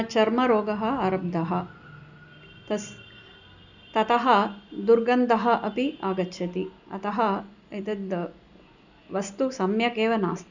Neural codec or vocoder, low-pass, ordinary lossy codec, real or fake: none; 7.2 kHz; none; real